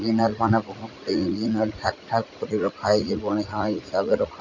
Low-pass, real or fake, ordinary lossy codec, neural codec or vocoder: 7.2 kHz; fake; none; vocoder, 44.1 kHz, 80 mel bands, Vocos